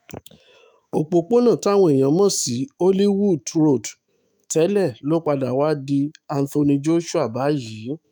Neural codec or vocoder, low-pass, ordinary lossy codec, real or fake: autoencoder, 48 kHz, 128 numbers a frame, DAC-VAE, trained on Japanese speech; none; none; fake